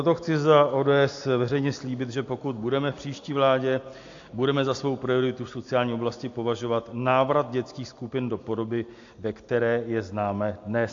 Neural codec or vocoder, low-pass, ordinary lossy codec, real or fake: none; 7.2 kHz; AAC, 48 kbps; real